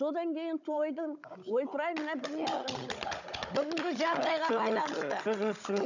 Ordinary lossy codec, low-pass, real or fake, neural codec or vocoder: none; 7.2 kHz; fake; codec, 16 kHz, 16 kbps, FunCodec, trained on Chinese and English, 50 frames a second